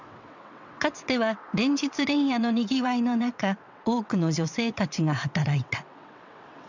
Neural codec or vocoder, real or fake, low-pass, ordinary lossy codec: vocoder, 22.05 kHz, 80 mel bands, WaveNeXt; fake; 7.2 kHz; none